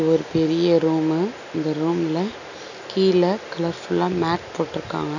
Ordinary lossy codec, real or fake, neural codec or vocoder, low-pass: none; real; none; 7.2 kHz